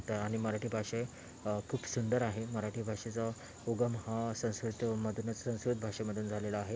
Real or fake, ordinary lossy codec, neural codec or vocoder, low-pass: real; none; none; none